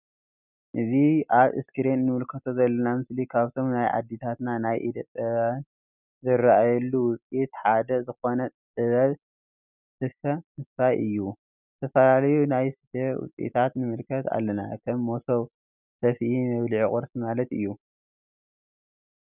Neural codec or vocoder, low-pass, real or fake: none; 3.6 kHz; real